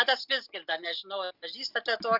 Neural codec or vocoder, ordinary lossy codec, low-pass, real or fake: none; Opus, 64 kbps; 5.4 kHz; real